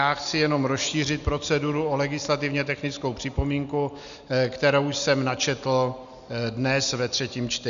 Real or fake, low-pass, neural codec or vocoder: real; 7.2 kHz; none